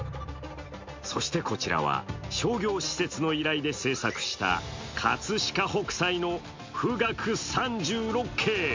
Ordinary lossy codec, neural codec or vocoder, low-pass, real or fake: MP3, 48 kbps; none; 7.2 kHz; real